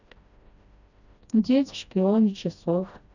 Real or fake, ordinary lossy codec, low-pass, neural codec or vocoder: fake; none; 7.2 kHz; codec, 16 kHz, 1 kbps, FreqCodec, smaller model